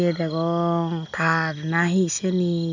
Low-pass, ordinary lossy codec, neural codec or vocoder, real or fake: 7.2 kHz; none; none; real